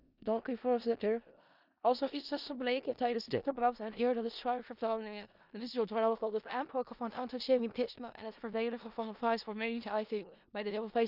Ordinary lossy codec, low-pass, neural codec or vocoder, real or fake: none; 5.4 kHz; codec, 16 kHz in and 24 kHz out, 0.4 kbps, LongCat-Audio-Codec, four codebook decoder; fake